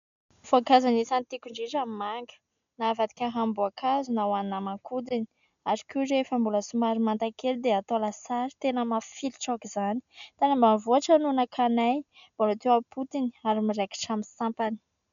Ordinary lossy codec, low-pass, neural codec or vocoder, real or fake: MP3, 96 kbps; 7.2 kHz; none; real